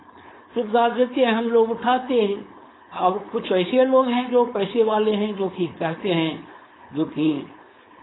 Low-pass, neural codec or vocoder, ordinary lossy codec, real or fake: 7.2 kHz; codec, 16 kHz, 4.8 kbps, FACodec; AAC, 16 kbps; fake